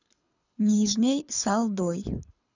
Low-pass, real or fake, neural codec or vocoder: 7.2 kHz; fake; codec, 24 kHz, 6 kbps, HILCodec